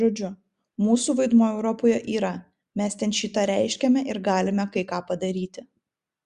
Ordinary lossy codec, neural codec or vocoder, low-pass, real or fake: Opus, 64 kbps; none; 10.8 kHz; real